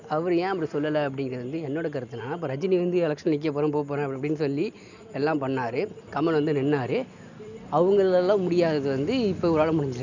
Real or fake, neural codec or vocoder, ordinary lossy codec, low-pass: real; none; none; 7.2 kHz